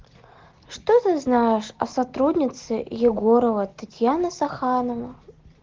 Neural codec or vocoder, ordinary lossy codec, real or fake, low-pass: none; Opus, 16 kbps; real; 7.2 kHz